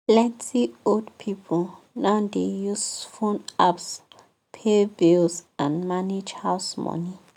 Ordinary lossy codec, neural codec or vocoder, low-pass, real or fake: none; none; 19.8 kHz; real